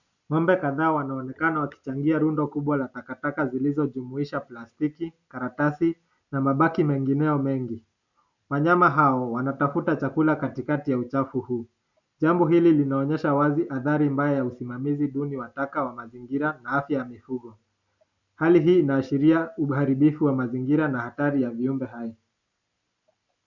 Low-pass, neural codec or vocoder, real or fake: 7.2 kHz; none; real